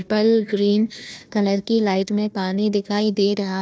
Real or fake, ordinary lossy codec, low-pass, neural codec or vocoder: fake; none; none; codec, 16 kHz, 1 kbps, FunCodec, trained on Chinese and English, 50 frames a second